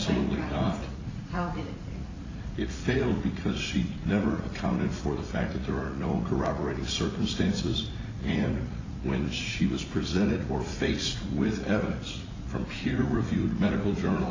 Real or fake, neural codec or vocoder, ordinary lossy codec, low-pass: fake; vocoder, 44.1 kHz, 80 mel bands, Vocos; AAC, 32 kbps; 7.2 kHz